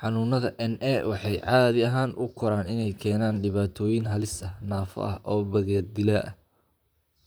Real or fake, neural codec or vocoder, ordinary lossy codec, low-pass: fake; vocoder, 44.1 kHz, 128 mel bands, Pupu-Vocoder; none; none